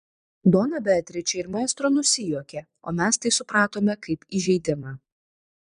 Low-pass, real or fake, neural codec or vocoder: 9.9 kHz; fake; vocoder, 22.05 kHz, 80 mel bands, Vocos